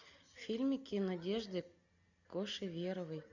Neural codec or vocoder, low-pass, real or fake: none; 7.2 kHz; real